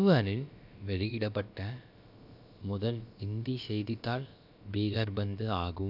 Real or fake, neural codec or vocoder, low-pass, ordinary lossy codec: fake; codec, 16 kHz, about 1 kbps, DyCAST, with the encoder's durations; 5.4 kHz; none